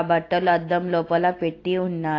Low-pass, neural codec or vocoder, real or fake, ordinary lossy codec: 7.2 kHz; none; real; AAC, 32 kbps